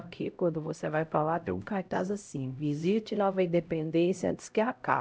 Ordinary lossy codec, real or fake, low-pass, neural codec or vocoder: none; fake; none; codec, 16 kHz, 0.5 kbps, X-Codec, HuBERT features, trained on LibriSpeech